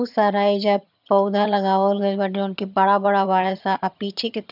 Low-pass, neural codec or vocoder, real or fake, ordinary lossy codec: 5.4 kHz; vocoder, 22.05 kHz, 80 mel bands, HiFi-GAN; fake; none